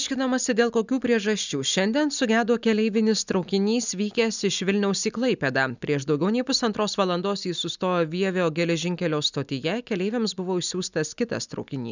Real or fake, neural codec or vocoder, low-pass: real; none; 7.2 kHz